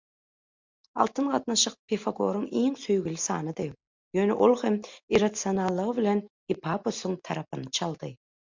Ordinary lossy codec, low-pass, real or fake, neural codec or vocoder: MP3, 64 kbps; 7.2 kHz; real; none